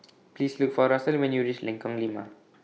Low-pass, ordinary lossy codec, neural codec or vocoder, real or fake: none; none; none; real